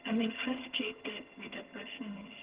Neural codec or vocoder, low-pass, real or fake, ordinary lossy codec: vocoder, 22.05 kHz, 80 mel bands, HiFi-GAN; 3.6 kHz; fake; Opus, 16 kbps